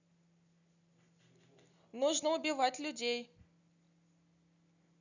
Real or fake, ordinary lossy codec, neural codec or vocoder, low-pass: real; none; none; 7.2 kHz